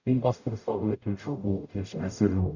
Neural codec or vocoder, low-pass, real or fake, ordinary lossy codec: codec, 44.1 kHz, 0.9 kbps, DAC; 7.2 kHz; fake; none